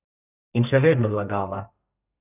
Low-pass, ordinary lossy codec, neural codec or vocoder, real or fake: 3.6 kHz; none; codec, 32 kHz, 1.9 kbps, SNAC; fake